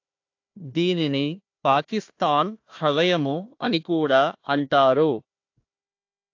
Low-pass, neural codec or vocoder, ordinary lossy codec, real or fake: 7.2 kHz; codec, 16 kHz, 1 kbps, FunCodec, trained on Chinese and English, 50 frames a second; AAC, 48 kbps; fake